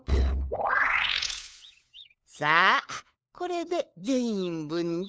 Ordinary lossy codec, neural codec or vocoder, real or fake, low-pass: none; codec, 16 kHz, 4 kbps, FunCodec, trained on LibriTTS, 50 frames a second; fake; none